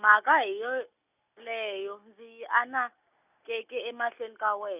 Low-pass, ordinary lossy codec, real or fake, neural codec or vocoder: 3.6 kHz; none; real; none